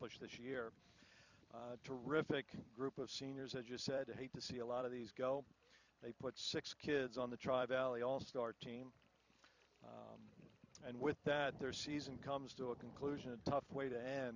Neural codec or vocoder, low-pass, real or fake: vocoder, 44.1 kHz, 128 mel bands every 256 samples, BigVGAN v2; 7.2 kHz; fake